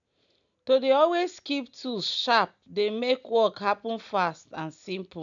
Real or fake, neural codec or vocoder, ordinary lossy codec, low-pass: real; none; none; 7.2 kHz